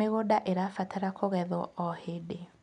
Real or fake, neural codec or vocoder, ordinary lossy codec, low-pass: real; none; none; 10.8 kHz